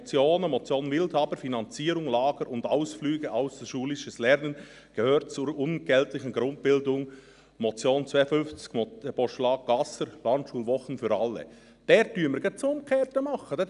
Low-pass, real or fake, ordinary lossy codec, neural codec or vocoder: 10.8 kHz; real; none; none